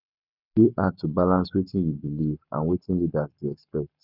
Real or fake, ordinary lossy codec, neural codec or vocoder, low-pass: fake; none; codec, 44.1 kHz, 7.8 kbps, Pupu-Codec; 5.4 kHz